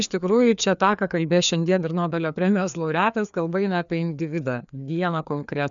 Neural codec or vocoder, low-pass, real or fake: codec, 16 kHz, 2 kbps, FreqCodec, larger model; 7.2 kHz; fake